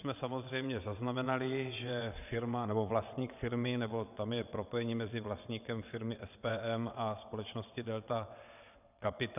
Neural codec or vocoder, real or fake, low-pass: vocoder, 22.05 kHz, 80 mel bands, WaveNeXt; fake; 3.6 kHz